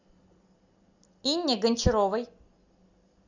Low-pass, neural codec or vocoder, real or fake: 7.2 kHz; none; real